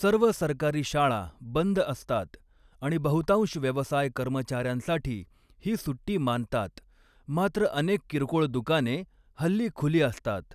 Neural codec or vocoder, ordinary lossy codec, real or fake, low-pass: none; none; real; 14.4 kHz